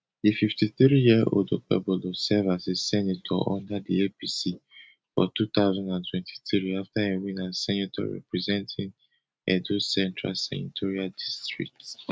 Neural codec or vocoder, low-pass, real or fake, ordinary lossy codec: none; none; real; none